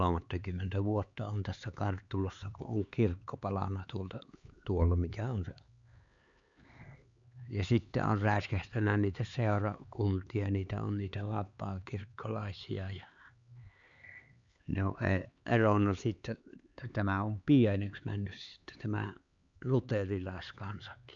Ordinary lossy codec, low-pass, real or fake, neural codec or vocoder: Opus, 64 kbps; 7.2 kHz; fake; codec, 16 kHz, 4 kbps, X-Codec, HuBERT features, trained on LibriSpeech